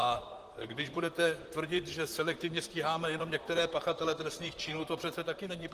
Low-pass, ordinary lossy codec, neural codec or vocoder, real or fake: 14.4 kHz; Opus, 24 kbps; vocoder, 44.1 kHz, 128 mel bands, Pupu-Vocoder; fake